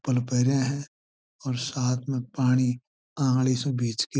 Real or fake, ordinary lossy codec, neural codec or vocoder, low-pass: real; none; none; none